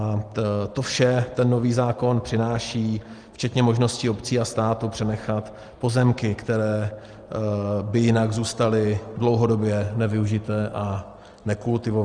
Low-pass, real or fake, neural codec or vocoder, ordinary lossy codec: 9.9 kHz; real; none; Opus, 24 kbps